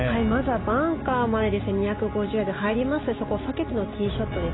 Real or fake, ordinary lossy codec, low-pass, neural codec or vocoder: real; AAC, 16 kbps; 7.2 kHz; none